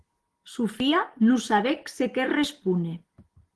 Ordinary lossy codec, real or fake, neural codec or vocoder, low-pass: Opus, 16 kbps; real; none; 10.8 kHz